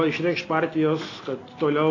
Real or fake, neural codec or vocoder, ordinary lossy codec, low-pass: real; none; AAC, 32 kbps; 7.2 kHz